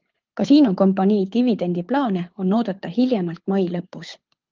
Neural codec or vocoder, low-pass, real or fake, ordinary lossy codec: codec, 24 kHz, 6 kbps, HILCodec; 7.2 kHz; fake; Opus, 32 kbps